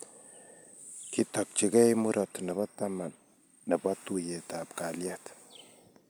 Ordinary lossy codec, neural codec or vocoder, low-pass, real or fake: none; none; none; real